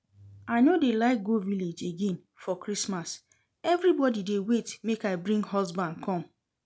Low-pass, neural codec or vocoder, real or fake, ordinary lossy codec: none; none; real; none